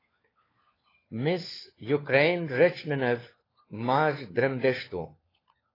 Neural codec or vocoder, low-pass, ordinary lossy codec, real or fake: codec, 16 kHz, 4 kbps, FunCodec, trained on LibriTTS, 50 frames a second; 5.4 kHz; AAC, 24 kbps; fake